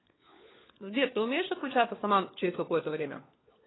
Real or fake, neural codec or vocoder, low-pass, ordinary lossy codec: fake; codec, 16 kHz, 4 kbps, FunCodec, trained on LibriTTS, 50 frames a second; 7.2 kHz; AAC, 16 kbps